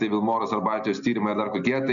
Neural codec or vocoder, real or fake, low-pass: none; real; 7.2 kHz